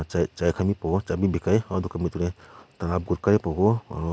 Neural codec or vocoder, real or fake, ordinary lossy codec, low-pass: none; real; none; none